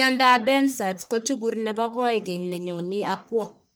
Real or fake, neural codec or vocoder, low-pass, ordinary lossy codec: fake; codec, 44.1 kHz, 1.7 kbps, Pupu-Codec; none; none